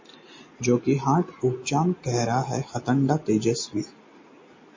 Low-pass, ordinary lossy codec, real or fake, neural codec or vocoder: 7.2 kHz; MP3, 32 kbps; real; none